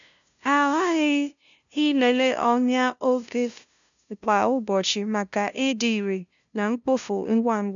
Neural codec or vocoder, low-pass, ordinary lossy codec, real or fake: codec, 16 kHz, 0.5 kbps, FunCodec, trained on LibriTTS, 25 frames a second; 7.2 kHz; none; fake